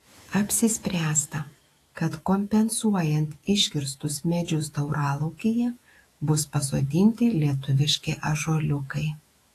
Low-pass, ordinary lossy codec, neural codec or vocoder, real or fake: 14.4 kHz; AAC, 48 kbps; autoencoder, 48 kHz, 128 numbers a frame, DAC-VAE, trained on Japanese speech; fake